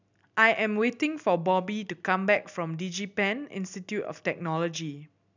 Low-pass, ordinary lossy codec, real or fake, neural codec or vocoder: 7.2 kHz; none; real; none